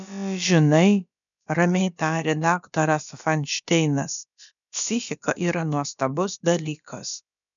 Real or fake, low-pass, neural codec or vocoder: fake; 7.2 kHz; codec, 16 kHz, about 1 kbps, DyCAST, with the encoder's durations